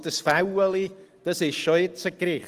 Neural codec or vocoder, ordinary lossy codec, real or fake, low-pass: none; Opus, 24 kbps; real; 14.4 kHz